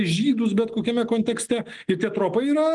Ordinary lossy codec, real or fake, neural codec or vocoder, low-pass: Opus, 24 kbps; real; none; 10.8 kHz